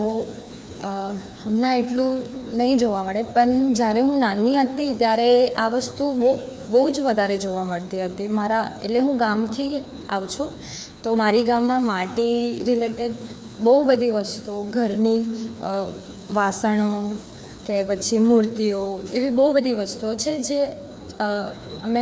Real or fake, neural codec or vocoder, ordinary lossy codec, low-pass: fake; codec, 16 kHz, 2 kbps, FreqCodec, larger model; none; none